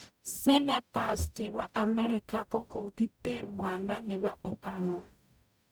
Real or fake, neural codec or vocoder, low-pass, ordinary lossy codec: fake; codec, 44.1 kHz, 0.9 kbps, DAC; none; none